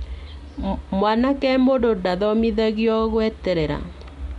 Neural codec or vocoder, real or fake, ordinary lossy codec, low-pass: none; real; MP3, 64 kbps; 10.8 kHz